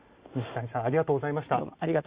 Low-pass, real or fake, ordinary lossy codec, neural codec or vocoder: 3.6 kHz; real; Opus, 64 kbps; none